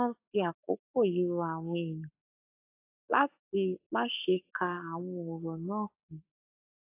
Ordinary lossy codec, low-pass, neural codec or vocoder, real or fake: AAC, 24 kbps; 3.6 kHz; codec, 44.1 kHz, 7.8 kbps, Pupu-Codec; fake